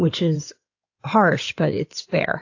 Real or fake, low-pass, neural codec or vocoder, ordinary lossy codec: fake; 7.2 kHz; codec, 16 kHz in and 24 kHz out, 2.2 kbps, FireRedTTS-2 codec; AAC, 48 kbps